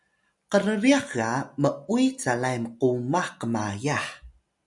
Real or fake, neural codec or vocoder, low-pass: real; none; 10.8 kHz